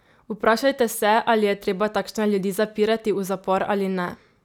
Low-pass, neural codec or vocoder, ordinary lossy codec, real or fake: 19.8 kHz; none; none; real